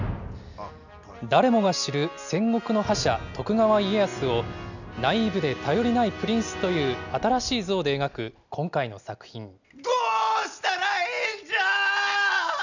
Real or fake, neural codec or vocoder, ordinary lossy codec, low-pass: real; none; none; 7.2 kHz